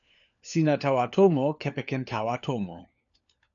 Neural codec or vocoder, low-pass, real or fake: codec, 16 kHz, 4 kbps, FunCodec, trained on LibriTTS, 50 frames a second; 7.2 kHz; fake